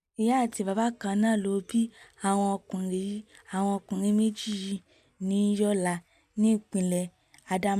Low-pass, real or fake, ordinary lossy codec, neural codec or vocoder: 14.4 kHz; real; none; none